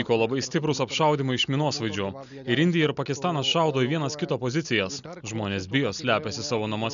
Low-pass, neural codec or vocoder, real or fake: 7.2 kHz; none; real